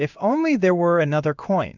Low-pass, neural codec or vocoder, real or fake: 7.2 kHz; codec, 16 kHz in and 24 kHz out, 1 kbps, XY-Tokenizer; fake